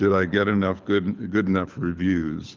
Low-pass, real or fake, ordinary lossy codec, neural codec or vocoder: 7.2 kHz; fake; Opus, 32 kbps; codec, 24 kHz, 6 kbps, HILCodec